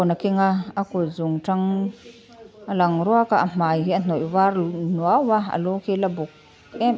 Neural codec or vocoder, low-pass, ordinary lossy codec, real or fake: none; none; none; real